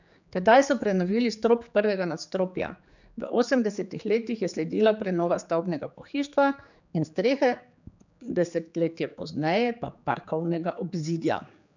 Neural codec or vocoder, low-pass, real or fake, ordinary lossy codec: codec, 16 kHz, 4 kbps, X-Codec, HuBERT features, trained on general audio; 7.2 kHz; fake; none